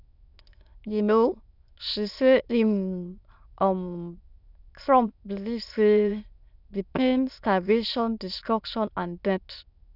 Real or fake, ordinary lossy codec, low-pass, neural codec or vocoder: fake; none; 5.4 kHz; autoencoder, 22.05 kHz, a latent of 192 numbers a frame, VITS, trained on many speakers